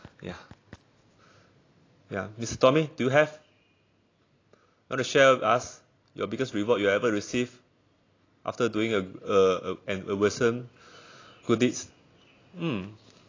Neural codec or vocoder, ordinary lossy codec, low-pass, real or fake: none; AAC, 32 kbps; 7.2 kHz; real